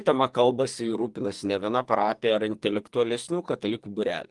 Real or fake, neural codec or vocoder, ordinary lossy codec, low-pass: fake; codec, 44.1 kHz, 2.6 kbps, SNAC; Opus, 24 kbps; 10.8 kHz